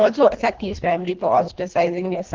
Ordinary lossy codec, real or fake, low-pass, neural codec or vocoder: Opus, 16 kbps; fake; 7.2 kHz; codec, 24 kHz, 1.5 kbps, HILCodec